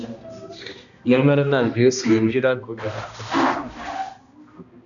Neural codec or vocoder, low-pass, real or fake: codec, 16 kHz, 1 kbps, X-Codec, HuBERT features, trained on balanced general audio; 7.2 kHz; fake